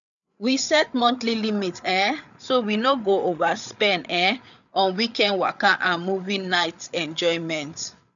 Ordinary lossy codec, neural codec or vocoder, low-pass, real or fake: none; codec, 16 kHz, 8 kbps, FreqCodec, larger model; 7.2 kHz; fake